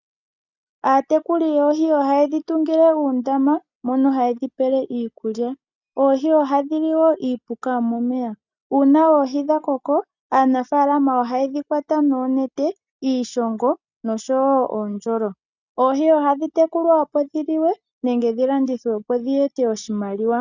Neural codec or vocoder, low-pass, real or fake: none; 7.2 kHz; real